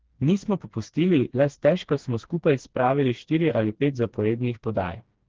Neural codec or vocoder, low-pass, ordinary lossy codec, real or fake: codec, 16 kHz, 2 kbps, FreqCodec, smaller model; 7.2 kHz; Opus, 16 kbps; fake